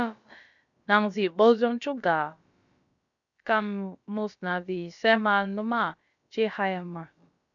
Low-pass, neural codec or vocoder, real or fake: 7.2 kHz; codec, 16 kHz, about 1 kbps, DyCAST, with the encoder's durations; fake